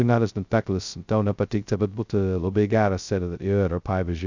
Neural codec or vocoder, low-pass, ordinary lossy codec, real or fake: codec, 16 kHz, 0.2 kbps, FocalCodec; 7.2 kHz; Opus, 64 kbps; fake